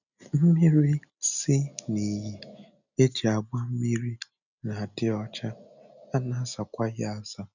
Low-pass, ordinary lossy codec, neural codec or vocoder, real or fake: 7.2 kHz; none; none; real